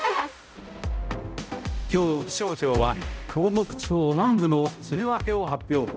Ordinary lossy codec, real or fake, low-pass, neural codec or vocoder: none; fake; none; codec, 16 kHz, 0.5 kbps, X-Codec, HuBERT features, trained on balanced general audio